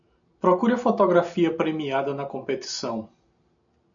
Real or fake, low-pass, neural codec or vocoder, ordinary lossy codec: real; 7.2 kHz; none; MP3, 96 kbps